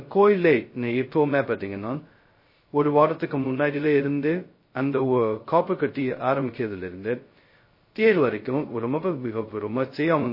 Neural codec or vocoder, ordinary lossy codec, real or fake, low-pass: codec, 16 kHz, 0.2 kbps, FocalCodec; MP3, 24 kbps; fake; 5.4 kHz